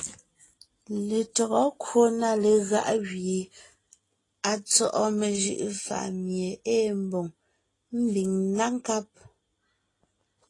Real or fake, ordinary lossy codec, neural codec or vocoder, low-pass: real; AAC, 32 kbps; none; 10.8 kHz